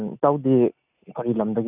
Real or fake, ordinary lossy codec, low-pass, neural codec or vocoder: real; none; 3.6 kHz; none